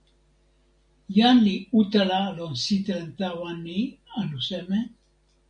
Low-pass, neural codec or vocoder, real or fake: 9.9 kHz; none; real